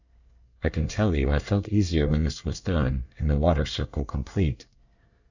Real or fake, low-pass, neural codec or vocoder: fake; 7.2 kHz; codec, 24 kHz, 1 kbps, SNAC